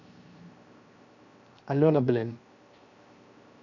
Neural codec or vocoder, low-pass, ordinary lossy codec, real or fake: codec, 16 kHz, 0.7 kbps, FocalCodec; 7.2 kHz; none; fake